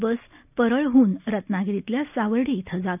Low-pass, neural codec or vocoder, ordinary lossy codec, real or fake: 3.6 kHz; none; none; real